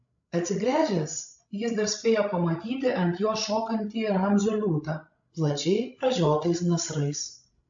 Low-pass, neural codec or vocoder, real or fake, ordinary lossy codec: 7.2 kHz; codec, 16 kHz, 16 kbps, FreqCodec, larger model; fake; AAC, 64 kbps